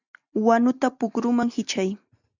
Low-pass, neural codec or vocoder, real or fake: 7.2 kHz; none; real